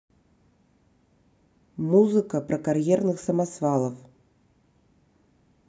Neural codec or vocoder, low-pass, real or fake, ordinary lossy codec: none; none; real; none